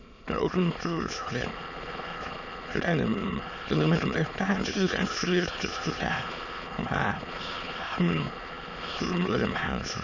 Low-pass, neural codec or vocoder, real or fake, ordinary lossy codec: 7.2 kHz; autoencoder, 22.05 kHz, a latent of 192 numbers a frame, VITS, trained on many speakers; fake; none